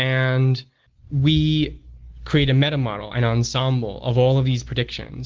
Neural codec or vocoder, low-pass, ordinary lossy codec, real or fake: none; 7.2 kHz; Opus, 16 kbps; real